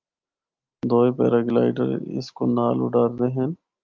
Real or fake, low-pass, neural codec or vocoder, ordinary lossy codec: real; 7.2 kHz; none; Opus, 24 kbps